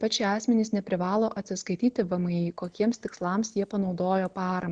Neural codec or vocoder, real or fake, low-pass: none; real; 9.9 kHz